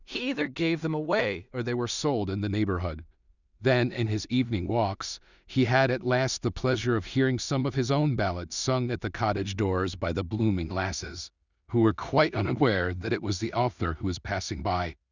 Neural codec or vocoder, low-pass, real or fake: codec, 16 kHz in and 24 kHz out, 0.4 kbps, LongCat-Audio-Codec, two codebook decoder; 7.2 kHz; fake